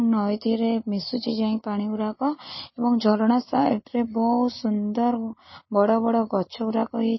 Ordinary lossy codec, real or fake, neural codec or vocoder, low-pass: MP3, 24 kbps; real; none; 7.2 kHz